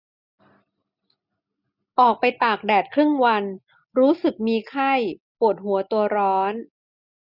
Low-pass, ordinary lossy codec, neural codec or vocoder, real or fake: 5.4 kHz; none; none; real